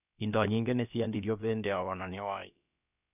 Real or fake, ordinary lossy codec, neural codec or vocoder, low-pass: fake; none; codec, 16 kHz, about 1 kbps, DyCAST, with the encoder's durations; 3.6 kHz